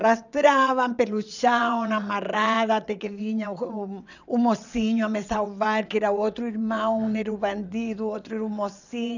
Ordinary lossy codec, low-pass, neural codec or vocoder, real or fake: none; 7.2 kHz; vocoder, 22.05 kHz, 80 mel bands, WaveNeXt; fake